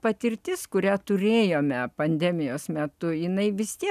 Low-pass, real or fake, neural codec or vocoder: 14.4 kHz; real; none